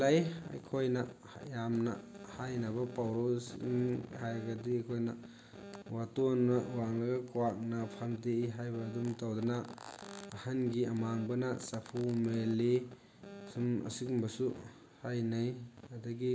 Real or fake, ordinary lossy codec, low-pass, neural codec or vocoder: real; none; none; none